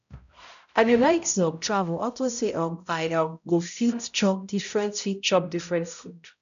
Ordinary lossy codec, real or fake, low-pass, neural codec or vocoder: none; fake; 7.2 kHz; codec, 16 kHz, 0.5 kbps, X-Codec, HuBERT features, trained on balanced general audio